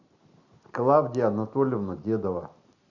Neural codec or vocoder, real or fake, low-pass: none; real; 7.2 kHz